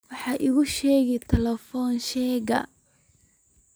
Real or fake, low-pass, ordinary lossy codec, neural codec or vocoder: real; none; none; none